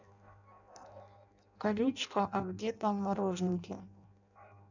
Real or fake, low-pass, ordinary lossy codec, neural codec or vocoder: fake; 7.2 kHz; none; codec, 16 kHz in and 24 kHz out, 0.6 kbps, FireRedTTS-2 codec